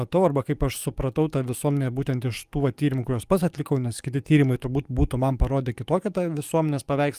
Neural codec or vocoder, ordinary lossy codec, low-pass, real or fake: codec, 44.1 kHz, 7.8 kbps, DAC; Opus, 32 kbps; 14.4 kHz; fake